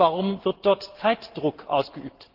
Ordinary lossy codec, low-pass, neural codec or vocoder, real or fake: Opus, 16 kbps; 5.4 kHz; none; real